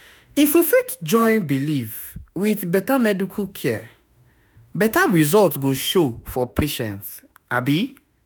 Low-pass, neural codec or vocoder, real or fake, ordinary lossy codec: none; autoencoder, 48 kHz, 32 numbers a frame, DAC-VAE, trained on Japanese speech; fake; none